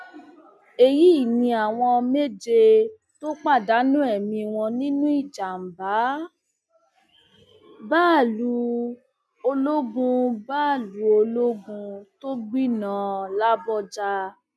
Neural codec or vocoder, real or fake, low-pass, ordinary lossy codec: none; real; none; none